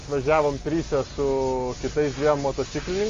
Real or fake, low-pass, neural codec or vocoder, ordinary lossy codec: real; 7.2 kHz; none; Opus, 32 kbps